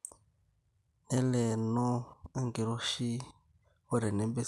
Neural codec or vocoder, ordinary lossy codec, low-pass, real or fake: none; none; none; real